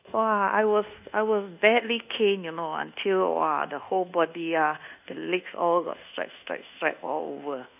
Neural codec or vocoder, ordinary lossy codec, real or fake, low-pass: codec, 24 kHz, 1.2 kbps, DualCodec; none; fake; 3.6 kHz